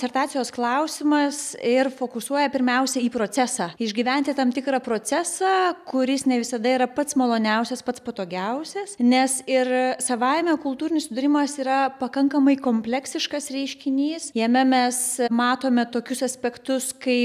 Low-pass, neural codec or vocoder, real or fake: 14.4 kHz; none; real